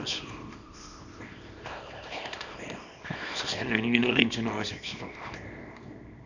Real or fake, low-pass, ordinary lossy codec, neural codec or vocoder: fake; 7.2 kHz; none; codec, 24 kHz, 0.9 kbps, WavTokenizer, small release